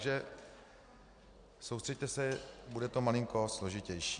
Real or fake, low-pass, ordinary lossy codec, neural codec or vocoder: real; 9.9 kHz; MP3, 64 kbps; none